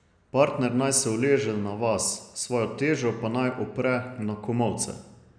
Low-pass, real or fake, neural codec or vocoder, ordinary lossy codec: 9.9 kHz; real; none; none